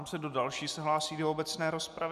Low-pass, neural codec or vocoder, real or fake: 14.4 kHz; none; real